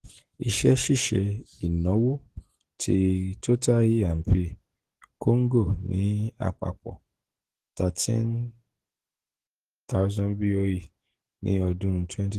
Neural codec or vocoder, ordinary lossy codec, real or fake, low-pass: codec, 44.1 kHz, 7.8 kbps, DAC; Opus, 16 kbps; fake; 14.4 kHz